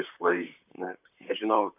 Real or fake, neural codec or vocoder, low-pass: fake; vocoder, 44.1 kHz, 128 mel bands, Pupu-Vocoder; 3.6 kHz